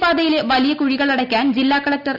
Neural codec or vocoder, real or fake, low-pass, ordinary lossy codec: none; real; 5.4 kHz; none